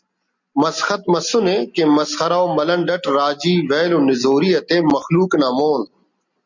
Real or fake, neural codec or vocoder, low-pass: real; none; 7.2 kHz